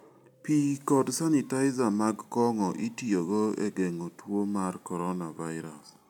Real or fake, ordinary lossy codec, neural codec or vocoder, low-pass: real; none; none; 19.8 kHz